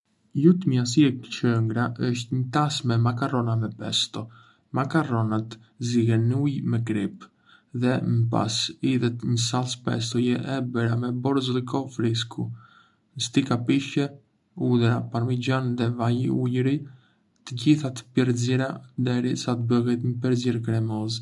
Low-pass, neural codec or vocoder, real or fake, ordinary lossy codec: 10.8 kHz; none; real; none